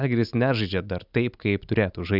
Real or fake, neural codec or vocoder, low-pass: real; none; 5.4 kHz